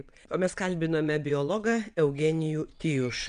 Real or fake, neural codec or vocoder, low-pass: fake; vocoder, 22.05 kHz, 80 mel bands, WaveNeXt; 9.9 kHz